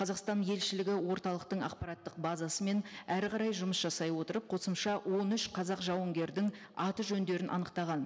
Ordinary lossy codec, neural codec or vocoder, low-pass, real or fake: none; none; none; real